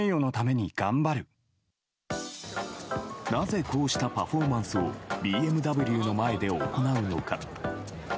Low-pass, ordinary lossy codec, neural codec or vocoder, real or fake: none; none; none; real